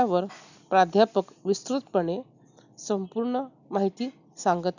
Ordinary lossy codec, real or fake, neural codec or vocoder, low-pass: none; real; none; 7.2 kHz